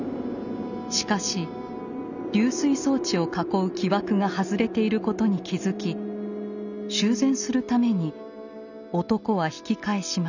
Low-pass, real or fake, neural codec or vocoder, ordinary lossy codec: 7.2 kHz; real; none; none